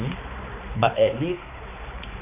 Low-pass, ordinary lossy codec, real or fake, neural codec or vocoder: 3.6 kHz; none; fake; codec, 16 kHz, 4 kbps, X-Codec, HuBERT features, trained on balanced general audio